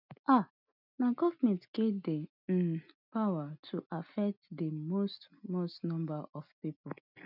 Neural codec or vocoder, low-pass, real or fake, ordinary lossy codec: none; 5.4 kHz; real; none